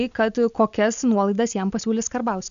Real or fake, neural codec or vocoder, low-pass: real; none; 7.2 kHz